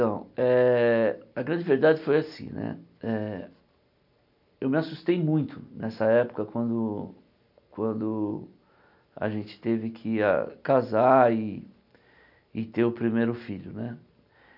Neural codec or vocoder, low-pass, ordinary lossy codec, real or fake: none; 5.4 kHz; none; real